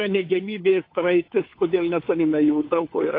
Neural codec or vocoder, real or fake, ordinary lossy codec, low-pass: codec, 16 kHz, 4 kbps, FunCodec, trained on LibriTTS, 50 frames a second; fake; AAC, 32 kbps; 5.4 kHz